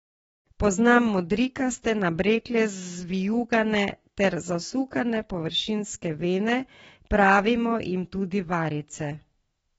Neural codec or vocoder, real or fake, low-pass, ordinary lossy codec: autoencoder, 48 kHz, 128 numbers a frame, DAC-VAE, trained on Japanese speech; fake; 19.8 kHz; AAC, 24 kbps